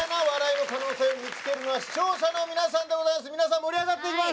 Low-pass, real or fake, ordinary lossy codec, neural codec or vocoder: none; real; none; none